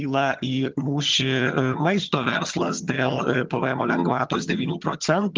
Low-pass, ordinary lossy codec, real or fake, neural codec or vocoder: 7.2 kHz; Opus, 32 kbps; fake; vocoder, 22.05 kHz, 80 mel bands, HiFi-GAN